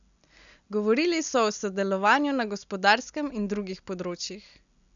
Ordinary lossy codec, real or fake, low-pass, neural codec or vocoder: none; real; 7.2 kHz; none